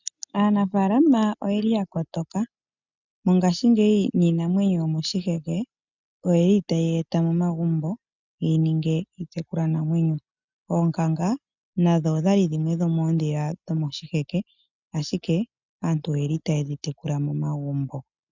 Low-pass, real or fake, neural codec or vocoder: 7.2 kHz; real; none